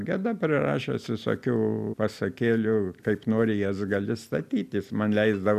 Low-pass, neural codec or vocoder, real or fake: 14.4 kHz; none; real